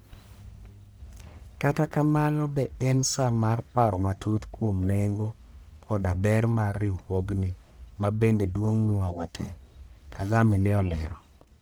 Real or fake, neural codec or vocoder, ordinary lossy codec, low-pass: fake; codec, 44.1 kHz, 1.7 kbps, Pupu-Codec; none; none